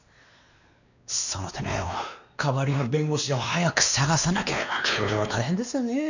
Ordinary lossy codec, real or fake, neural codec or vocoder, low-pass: none; fake; codec, 16 kHz, 2 kbps, X-Codec, WavLM features, trained on Multilingual LibriSpeech; 7.2 kHz